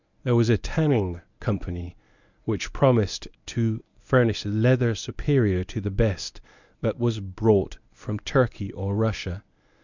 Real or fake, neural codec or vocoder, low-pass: fake; codec, 24 kHz, 0.9 kbps, WavTokenizer, medium speech release version 1; 7.2 kHz